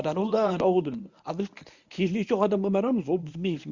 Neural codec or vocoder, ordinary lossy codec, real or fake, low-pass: codec, 24 kHz, 0.9 kbps, WavTokenizer, medium speech release version 1; Opus, 64 kbps; fake; 7.2 kHz